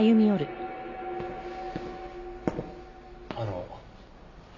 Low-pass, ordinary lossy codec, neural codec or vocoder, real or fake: 7.2 kHz; AAC, 48 kbps; none; real